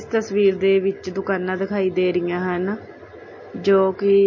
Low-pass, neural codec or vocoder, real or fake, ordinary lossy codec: 7.2 kHz; none; real; MP3, 32 kbps